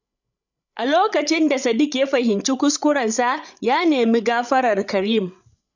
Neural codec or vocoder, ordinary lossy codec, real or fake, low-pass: codec, 16 kHz, 8 kbps, FreqCodec, larger model; none; fake; 7.2 kHz